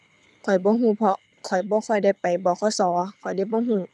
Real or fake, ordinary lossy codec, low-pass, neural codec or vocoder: fake; none; none; codec, 24 kHz, 6 kbps, HILCodec